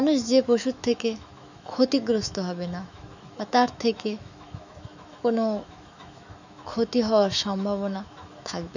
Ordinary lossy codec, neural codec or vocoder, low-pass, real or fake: none; autoencoder, 48 kHz, 128 numbers a frame, DAC-VAE, trained on Japanese speech; 7.2 kHz; fake